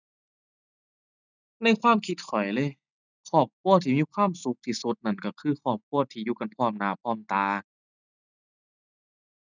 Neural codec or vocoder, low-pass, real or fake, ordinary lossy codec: none; 7.2 kHz; real; none